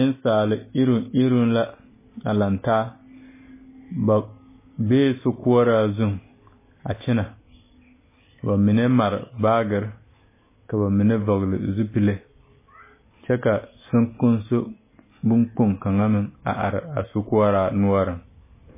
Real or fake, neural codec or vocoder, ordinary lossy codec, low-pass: real; none; MP3, 16 kbps; 3.6 kHz